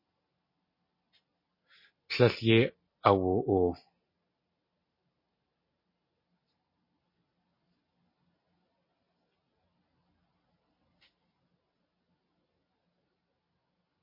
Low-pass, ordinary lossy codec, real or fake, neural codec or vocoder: 5.4 kHz; MP3, 24 kbps; real; none